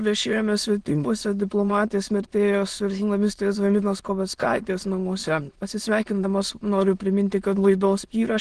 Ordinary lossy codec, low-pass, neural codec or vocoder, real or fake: Opus, 16 kbps; 9.9 kHz; autoencoder, 22.05 kHz, a latent of 192 numbers a frame, VITS, trained on many speakers; fake